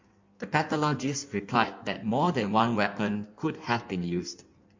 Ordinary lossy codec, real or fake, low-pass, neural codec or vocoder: MP3, 48 kbps; fake; 7.2 kHz; codec, 16 kHz in and 24 kHz out, 1.1 kbps, FireRedTTS-2 codec